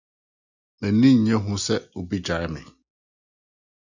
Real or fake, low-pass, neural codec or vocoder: real; 7.2 kHz; none